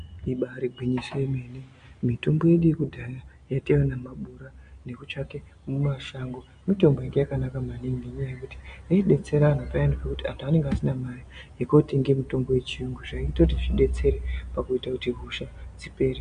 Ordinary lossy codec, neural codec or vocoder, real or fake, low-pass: MP3, 64 kbps; none; real; 9.9 kHz